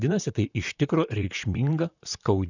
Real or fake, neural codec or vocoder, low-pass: fake; vocoder, 44.1 kHz, 128 mel bands, Pupu-Vocoder; 7.2 kHz